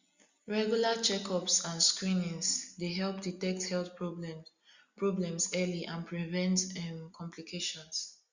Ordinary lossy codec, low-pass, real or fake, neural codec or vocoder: Opus, 64 kbps; 7.2 kHz; real; none